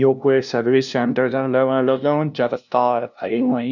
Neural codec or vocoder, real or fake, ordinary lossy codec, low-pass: codec, 16 kHz, 0.5 kbps, FunCodec, trained on LibriTTS, 25 frames a second; fake; none; 7.2 kHz